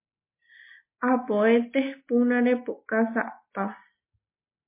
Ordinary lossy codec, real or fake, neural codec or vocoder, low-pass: MP3, 24 kbps; real; none; 3.6 kHz